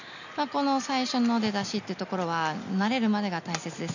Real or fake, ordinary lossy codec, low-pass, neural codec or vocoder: real; none; 7.2 kHz; none